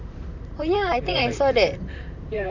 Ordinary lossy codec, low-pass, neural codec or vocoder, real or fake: none; 7.2 kHz; vocoder, 44.1 kHz, 128 mel bands, Pupu-Vocoder; fake